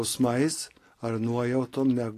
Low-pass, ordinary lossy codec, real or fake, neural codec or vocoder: 14.4 kHz; AAC, 48 kbps; real; none